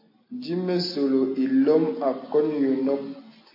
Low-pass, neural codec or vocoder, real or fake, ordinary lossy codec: 5.4 kHz; none; real; AAC, 24 kbps